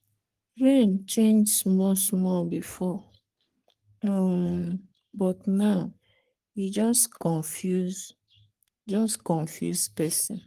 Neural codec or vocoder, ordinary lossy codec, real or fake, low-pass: codec, 44.1 kHz, 3.4 kbps, Pupu-Codec; Opus, 24 kbps; fake; 14.4 kHz